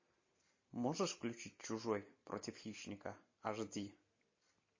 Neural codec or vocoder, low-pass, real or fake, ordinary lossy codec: none; 7.2 kHz; real; MP3, 32 kbps